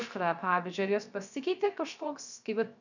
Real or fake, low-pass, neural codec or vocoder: fake; 7.2 kHz; codec, 16 kHz, 0.3 kbps, FocalCodec